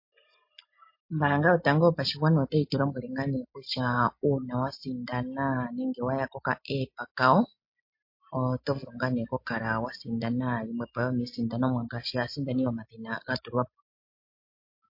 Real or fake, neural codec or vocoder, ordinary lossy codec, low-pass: real; none; MP3, 32 kbps; 5.4 kHz